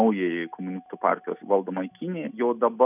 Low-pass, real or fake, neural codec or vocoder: 3.6 kHz; real; none